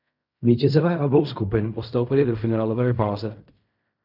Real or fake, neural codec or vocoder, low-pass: fake; codec, 16 kHz in and 24 kHz out, 0.4 kbps, LongCat-Audio-Codec, fine tuned four codebook decoder; 5.4 kHz